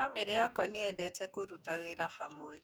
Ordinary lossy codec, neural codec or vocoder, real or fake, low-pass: none; codec, 44.1 kHz, 2.6 kbps, DAC; fake; none